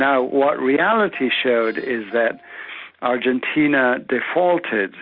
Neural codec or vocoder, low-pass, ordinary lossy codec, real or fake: none; 5.4 kHz; Opus, 64 kbps; real